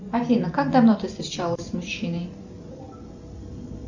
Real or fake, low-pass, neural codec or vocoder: real; 7.2 kHz; none